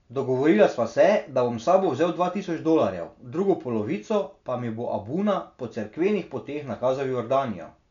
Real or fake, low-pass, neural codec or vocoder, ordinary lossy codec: real; 7.2 kHz; none; none